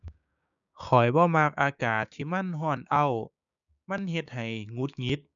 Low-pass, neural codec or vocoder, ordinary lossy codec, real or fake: 7.2 kHz; codec, 16 kHz, 6 kbps, DAC; none; fake